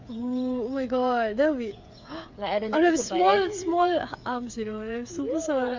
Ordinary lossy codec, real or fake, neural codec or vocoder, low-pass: MP3, 48 kbps; fake; codec, 16 kHz, 8 kbps, FreqCodec, smaller model; 7.2 kHz